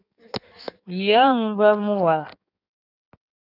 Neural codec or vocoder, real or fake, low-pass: codec, 16 kHz in and 24 kHz out, 1.1 kbps, FireRedTTS-2 codec; fake; 5.4 kHz